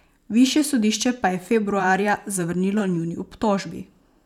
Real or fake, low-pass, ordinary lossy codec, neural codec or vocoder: fake; 19.8 kHz; none; vocoder, 44.1 kHz, 128 mel bands every 512 samples, BigVGAN v2